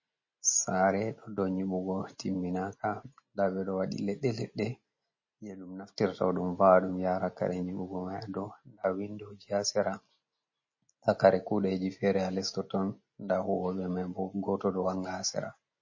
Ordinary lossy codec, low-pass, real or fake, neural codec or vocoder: MP3, 32 kbps; 7.2 kHz; real; none